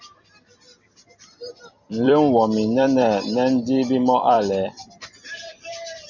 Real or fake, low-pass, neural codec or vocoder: fake; 7.2 kHz; vocoder, 44.1 kHz, 128 mel bands every 256 samples, BigVGAN v2